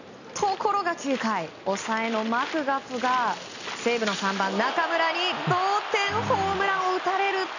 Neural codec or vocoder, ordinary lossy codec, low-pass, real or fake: none; none; 7.2 kHz; real